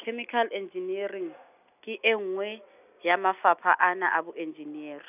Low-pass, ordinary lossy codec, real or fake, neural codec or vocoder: 3.6 kHz; none; real; none